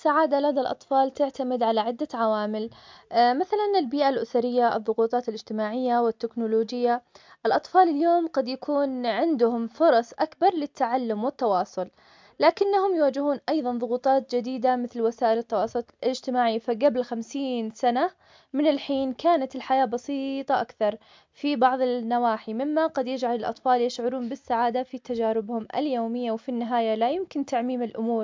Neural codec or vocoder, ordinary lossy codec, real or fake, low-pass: none; MP3, 64 kbps; real; 7.2 kHz